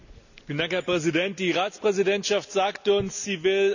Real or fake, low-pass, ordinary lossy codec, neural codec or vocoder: real; 7.2 kHz; none; none